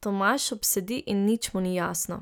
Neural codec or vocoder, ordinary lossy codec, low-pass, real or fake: none; none; none; real